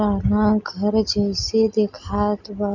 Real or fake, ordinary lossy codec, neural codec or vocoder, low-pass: real; none; none; 7.2 kHz